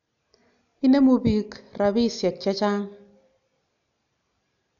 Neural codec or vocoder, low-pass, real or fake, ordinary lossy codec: none; 7.2 kHz; real; none